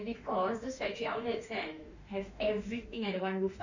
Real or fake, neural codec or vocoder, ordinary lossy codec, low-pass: fake; autoencoder, 48 kHz, 32 numbers a frame, DAC-VAE, trained on Japanese speech; Opus, 64 kbps; 7.2 kHz